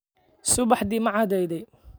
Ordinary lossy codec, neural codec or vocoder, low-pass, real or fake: none; none; none; real